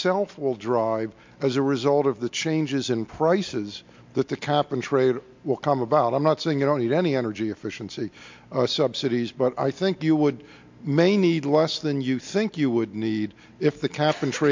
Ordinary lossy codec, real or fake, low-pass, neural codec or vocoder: MP3, 48 kbps; real; 7.2 kHz; none